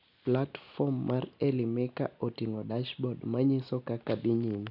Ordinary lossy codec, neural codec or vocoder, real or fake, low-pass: none; none; real; 5.4 kHz